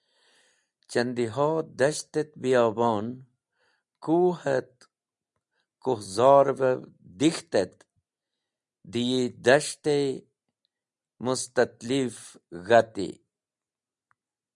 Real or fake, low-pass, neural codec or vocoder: real; 10.8 kHz; none